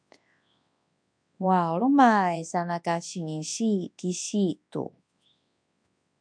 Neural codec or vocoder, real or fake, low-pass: codec, 24 kHz, 0.9 kbps, WavTokenizer, large speech release; fake; 9.9 kHz